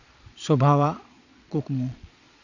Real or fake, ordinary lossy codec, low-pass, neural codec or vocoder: fake; none; 7.2 kHz; vocoder, 22.05 kHz, 80 mel bands, Vocos